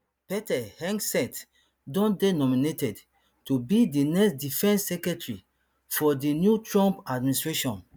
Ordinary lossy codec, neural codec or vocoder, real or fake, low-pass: none; none; real; none